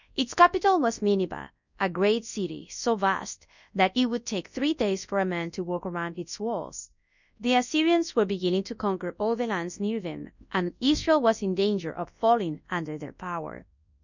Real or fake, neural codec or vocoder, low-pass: fake; codec, 24 kHz, 0.9 kbps, WavTokenizer, large speech release; 7.2 kHz